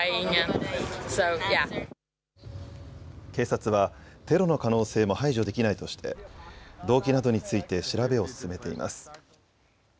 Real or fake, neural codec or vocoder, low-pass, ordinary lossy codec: real; none; none; none